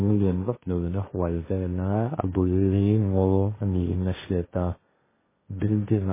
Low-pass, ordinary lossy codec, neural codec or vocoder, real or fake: 3.6 kHz; MP3, 16 kbps; codec, 16 kHz, 1.1 kbps, Voila-Tokenizer; fake